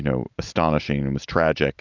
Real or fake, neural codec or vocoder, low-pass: real; none; 7.2 kHz